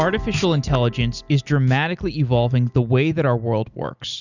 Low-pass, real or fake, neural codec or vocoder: 7.2 kHz; real; none